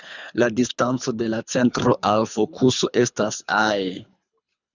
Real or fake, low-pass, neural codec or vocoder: fake; 7.2 kHz; codec, 24 kHz, 3 kbps, HILCodec